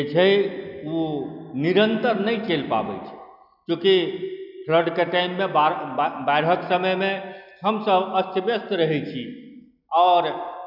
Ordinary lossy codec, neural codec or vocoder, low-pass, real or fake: none; none; 5.4 kHz; real